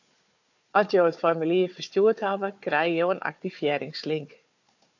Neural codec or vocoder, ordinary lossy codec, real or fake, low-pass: codec, 16 kHz, 4 kbps, FunCodec, trained on Chinese and English, 50 frames a second; AAC, 48 kbps; fake; 7.2 kHz